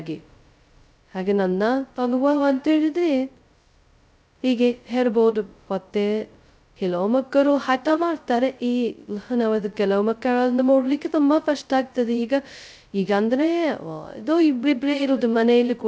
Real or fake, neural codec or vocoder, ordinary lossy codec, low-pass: fake; codec, 16 kHz, 0.2 kbps, FocalCodec; none; none